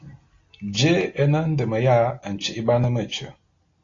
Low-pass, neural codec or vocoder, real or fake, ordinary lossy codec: 7.2 kHz; none; real; AAC, 32 kbps